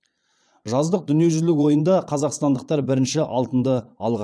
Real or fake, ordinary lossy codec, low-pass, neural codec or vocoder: fake; none; none; vocoder, 22.05 kHz, 80 mel bands, Vocos